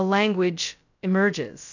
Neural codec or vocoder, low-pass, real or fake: codec, 16 kHz, 0.2 kbps, FocalCodec; 7.2 kHz; fake